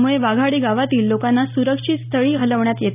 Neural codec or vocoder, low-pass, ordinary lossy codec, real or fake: none; 3.6 kHz; none; real